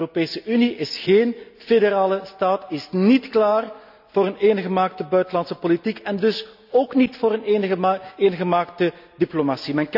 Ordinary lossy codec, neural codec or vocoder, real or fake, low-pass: none; none; real; 5.4 kHz